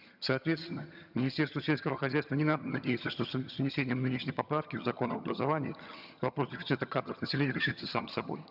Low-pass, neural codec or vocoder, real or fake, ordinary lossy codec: 5.4 kHz; vocoder, 22.05 kHz, 80 mel bands, HiFi-GAN; fake; none